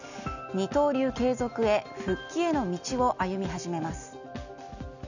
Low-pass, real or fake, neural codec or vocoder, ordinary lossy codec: 7.2 kHz; real; none; none